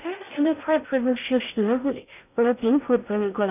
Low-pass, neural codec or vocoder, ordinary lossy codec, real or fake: 3.6 kHz; codec, 16 kHz in and 24 kHz out, 0.6 kbps, FocalCodec, streaming, 2048 codes; none; fake